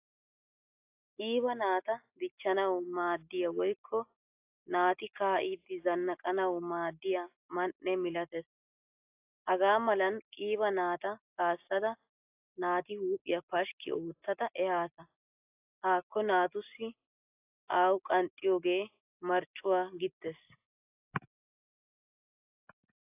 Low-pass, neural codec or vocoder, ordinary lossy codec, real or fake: 3.6 kHz; none; AAC, 32 kbps; real